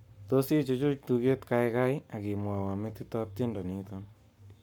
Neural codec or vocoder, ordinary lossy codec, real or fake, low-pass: codec, 44.1 kHz, 7.8 kbps, Pupu-Codec; none; fake; 19.8 kHz